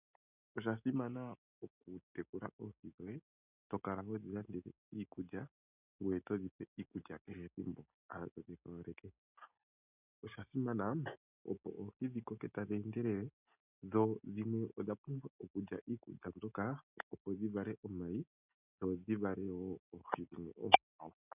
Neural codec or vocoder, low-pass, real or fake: none; 3.6 kHz; real